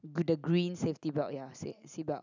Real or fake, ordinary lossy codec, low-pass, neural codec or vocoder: real; none; 7.2 kHz; none